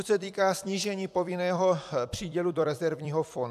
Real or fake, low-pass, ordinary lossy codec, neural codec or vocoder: fake; 14.4 kHz; AAC, 96 kbps; vocoder, 44.1 kHz, 128 mel bands every 256 samples, BigVGAN v2